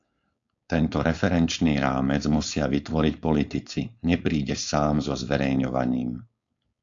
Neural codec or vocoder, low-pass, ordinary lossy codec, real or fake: codec, 16 kHz, 4.8 kbps, FACodec; 7.2 kHz; AAC, 64 kbps; fake